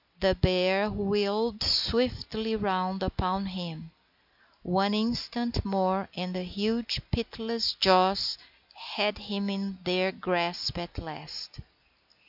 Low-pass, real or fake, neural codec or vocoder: 5.4 kHz; real; none